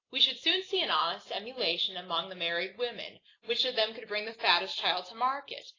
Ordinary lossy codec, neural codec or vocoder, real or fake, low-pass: AAC, 32 kbps; none; real; 7.2 kHz